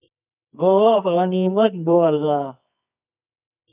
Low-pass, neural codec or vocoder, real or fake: 3.6 kHz; codec, 24 kHz, 0.9 kbps, WavTokenizer, medium music audio release; fake